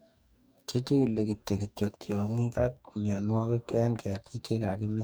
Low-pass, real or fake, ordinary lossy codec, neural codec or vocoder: none; fake; none; codec, 44.1 kHz, 2.6 kbps, DAC